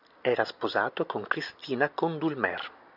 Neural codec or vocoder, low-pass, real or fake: none; 5.4 kHz; real